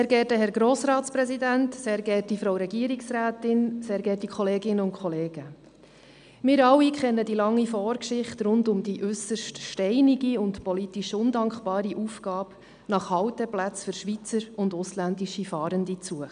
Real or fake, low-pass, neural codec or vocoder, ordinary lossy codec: real; 9.9 kHz; none; none